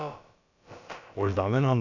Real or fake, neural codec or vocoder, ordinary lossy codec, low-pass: fake; codec, 16 kHz, about 1 kbps, DyCAST, with the encoder's durations; none; 7.2 kHz